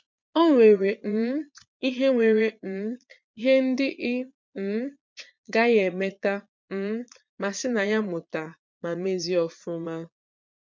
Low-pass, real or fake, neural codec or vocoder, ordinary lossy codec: 7.2 kHz; fake; vocoder, 22.05 kHz, 80 mel bands, Vocos; MP3, 64 kbps